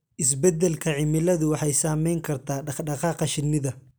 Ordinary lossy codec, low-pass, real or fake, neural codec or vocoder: none; none; real; none